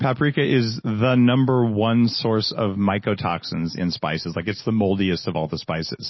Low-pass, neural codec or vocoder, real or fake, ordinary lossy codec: 7.2 kHz; none; real; MP3, 24 kbps